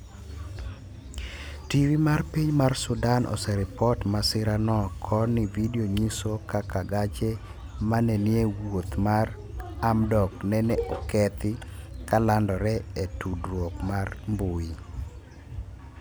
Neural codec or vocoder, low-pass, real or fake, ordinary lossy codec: none; none; real; none